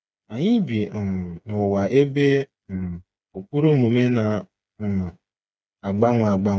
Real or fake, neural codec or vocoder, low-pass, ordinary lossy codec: fake; codec, 16 kHz, 4 kbps, FreqCodec, smaller model; none; none